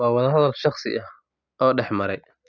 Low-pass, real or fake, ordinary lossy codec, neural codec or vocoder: 7.2 kHz; real; none; none